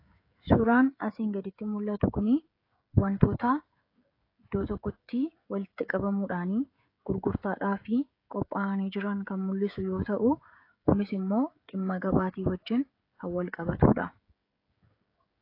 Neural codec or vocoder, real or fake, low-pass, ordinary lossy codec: codec, 16 kHz, 16 kbps, FreqCodec, smaller model; fake; 5.4 kHz; AAC, 32 kbps